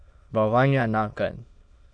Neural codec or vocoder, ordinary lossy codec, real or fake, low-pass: autoencoder, 22.05 kHz, a latent of 192 numbers a frame, VITS, trained on many speakers; AAC, 64 kbps; fake; 9.9 kHz